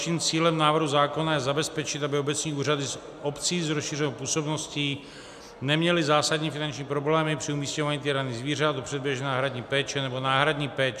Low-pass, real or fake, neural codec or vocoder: 14.4 kHz; real; none